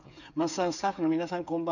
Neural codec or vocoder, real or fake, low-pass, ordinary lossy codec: codec, 16 kHz, 8 kbps, FreqCodec, smaller model; fake; 7.2 kHz; none